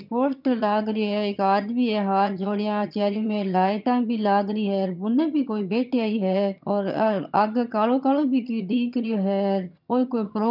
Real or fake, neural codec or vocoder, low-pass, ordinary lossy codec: fake; vocoder, 22.05 kHz, 80 mel bands, HiFi-GAN; 5.4 kHz; none